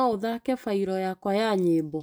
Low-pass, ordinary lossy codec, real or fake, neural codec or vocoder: none; none; real; none